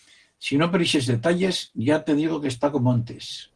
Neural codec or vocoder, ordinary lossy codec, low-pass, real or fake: none; Opus, 16 kbps; 9.9 kHz; real